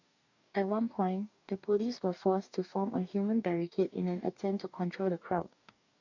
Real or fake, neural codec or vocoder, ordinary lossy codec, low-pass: fake; codec, 44.1 kHz, 2.6 kbps, DAC; none; 7.2 kHz